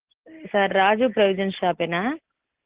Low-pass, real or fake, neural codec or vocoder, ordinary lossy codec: 3.6 kHz; real; none; Opus, 16 kbps